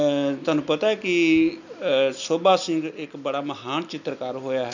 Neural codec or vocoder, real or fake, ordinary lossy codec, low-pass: none; real; none; 7.2 kHz